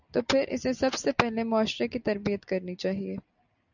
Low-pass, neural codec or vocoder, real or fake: 7.2 kHz; none; real